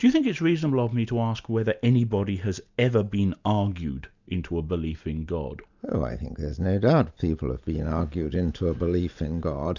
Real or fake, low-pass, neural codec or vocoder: real; 7.2 kHz; none